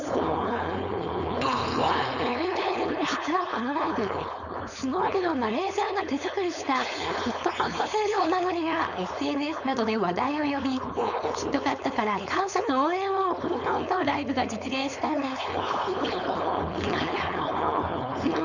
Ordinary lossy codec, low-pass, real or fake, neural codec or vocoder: none; 7.2 kHz; fake; codec, 16 kHz, 4.8 kbps, FACodec